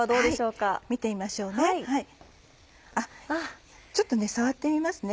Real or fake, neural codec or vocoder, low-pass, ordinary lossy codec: real; none; none; none